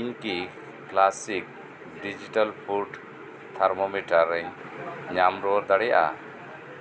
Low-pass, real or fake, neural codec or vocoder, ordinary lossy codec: none; real; none; none